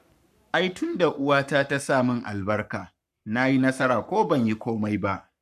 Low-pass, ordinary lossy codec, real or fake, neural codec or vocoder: 14.4 kHz; none; fake; codec, 44.1 kHz, 7.8 kbps, Pupu-Codec